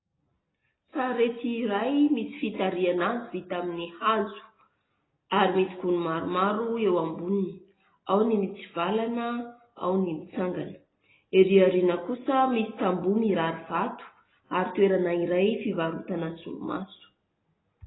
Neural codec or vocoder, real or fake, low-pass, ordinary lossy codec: none; real; 7.2 kHz; AAC, 16 kbps